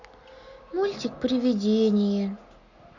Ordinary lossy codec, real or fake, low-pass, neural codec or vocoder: Opus, 64 kbps; real; 7.2 kHz; none